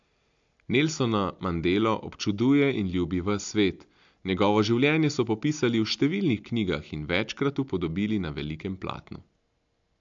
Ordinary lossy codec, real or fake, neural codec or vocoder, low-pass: AAC, 64 kbps; real; none; 7.2 kHz